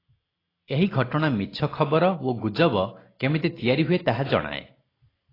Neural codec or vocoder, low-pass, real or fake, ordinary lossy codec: none; 5.4 kHz; real; AAC, 24 kbps